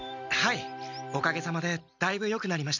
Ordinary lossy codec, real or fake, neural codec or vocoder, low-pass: none; real; none; 7.2 kHz